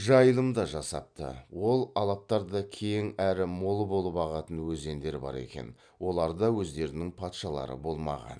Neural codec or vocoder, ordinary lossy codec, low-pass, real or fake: none; none; 9.9 kHz; real